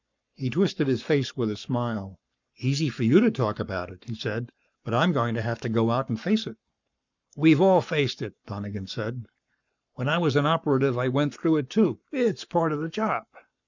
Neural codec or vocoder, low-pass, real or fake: codec, 44.1 kHz, 7.8 kbps, Pupu-Codec; 7.2 kHz; fake